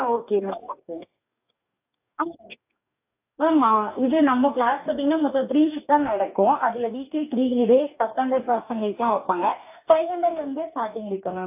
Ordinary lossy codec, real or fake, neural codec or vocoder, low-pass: AAC, 32 kbps; fake; codec, 44.1 kHz, 2.6 kbps, DAC; 3.6 kHz